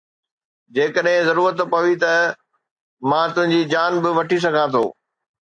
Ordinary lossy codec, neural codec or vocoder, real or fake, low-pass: AAC, 64 kbps; none; real; 9.9 kHz